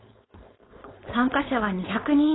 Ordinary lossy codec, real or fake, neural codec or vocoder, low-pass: AAC, 16 kbps; fake; codec, 16 kHz, 4.8 kbps, FACodec; 7.2 kHz